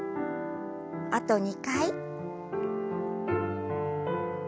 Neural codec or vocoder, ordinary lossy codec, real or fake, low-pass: none; none; real; none